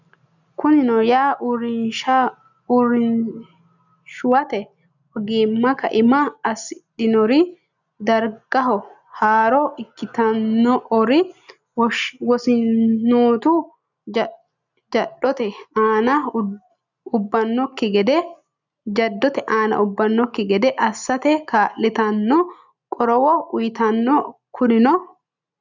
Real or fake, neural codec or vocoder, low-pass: real; none; 7.2 kHz